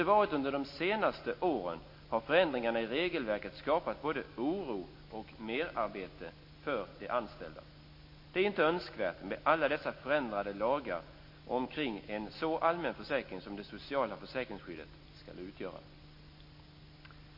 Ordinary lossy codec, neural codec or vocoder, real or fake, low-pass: MP3, 32 kbps; none; real; 5.4 kHz